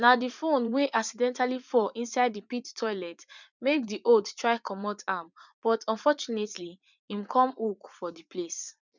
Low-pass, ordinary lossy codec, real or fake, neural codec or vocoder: 7.2 kHz; none; fake; vocoder, 44.1 kHz, 80 mel bands, Vocos